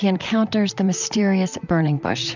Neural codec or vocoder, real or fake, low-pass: vocoder, 44.1 kHz, 128 mel bands, Pupu-Vocoder; fake; 7.2 kHz